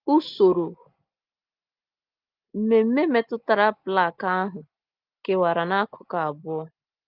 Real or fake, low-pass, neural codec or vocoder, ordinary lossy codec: real; 5.4 kHz; none; Opus, 24 kbps